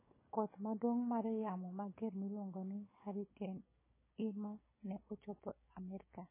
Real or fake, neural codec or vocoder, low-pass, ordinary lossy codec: fake; codec, 16 kHz, 6 kbps, DAC; 3.6 kHz; MP3, 16 kbps